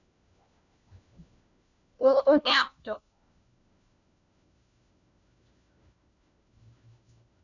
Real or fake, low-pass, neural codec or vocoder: fake; 7.2 kHz; codec, 16 kHz, 1 kbps, FunCodec, trained on LibriTTS, 50 frames a second